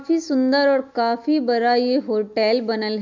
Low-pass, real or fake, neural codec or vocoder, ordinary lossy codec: 7.2 kHz; real; none; MP3, 64 kbps